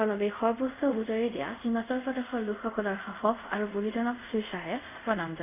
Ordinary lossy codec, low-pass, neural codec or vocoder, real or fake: none; 3.6 kHz; codec, 24 kHz, 0.5 kbps, DualCodec; fake